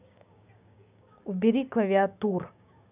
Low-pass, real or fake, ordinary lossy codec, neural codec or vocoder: 3.6 kHz; fake; none; codec, 16 kHz, 6 kbps, DAC